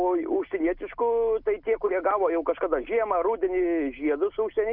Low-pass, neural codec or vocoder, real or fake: 5.4 kHz; none; real